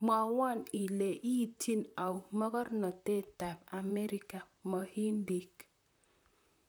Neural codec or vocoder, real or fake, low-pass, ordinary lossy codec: vocoder, 44.1 kHz, 128 mel bands, Pupu-Vocoder; fake; none; none